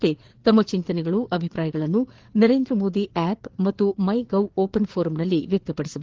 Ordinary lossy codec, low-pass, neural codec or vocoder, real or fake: Opus, 16 kbps; 7.2 kHz; codec, 16 kHz, 4 kbps, FreqCodec, larger model; fake